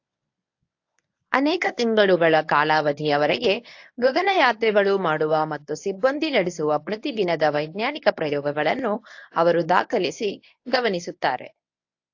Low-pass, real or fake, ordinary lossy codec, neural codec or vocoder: 7.2 kHz; fake; AAC, 48 kbps; codec, 24 kHz, 0.9 kbps, WavTokenizer, medium speech release version 1